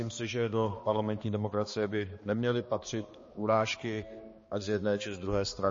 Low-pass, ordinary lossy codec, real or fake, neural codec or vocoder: 7.2 kHz; MP3, 32 kbps; fake; codec, 16 kHz, 2 kbps, X-Codec, HuBERT features, trained on balanced general audio